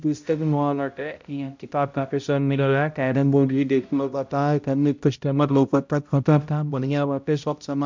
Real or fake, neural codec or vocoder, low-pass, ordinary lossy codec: fake; codec, 16 kHz, 0.5 kbps, X-Codec, HuBERT features, trained on balanced general audio; 7.2 kHz; MP3, 64 kbps